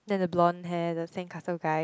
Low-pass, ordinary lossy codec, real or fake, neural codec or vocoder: none; none; real; none